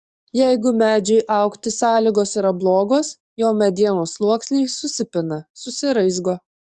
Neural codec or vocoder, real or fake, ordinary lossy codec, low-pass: codec, 44.1 kHz, 7.8 kbps, DAC; fake; Opus, 64 kbps; 10.8 kHz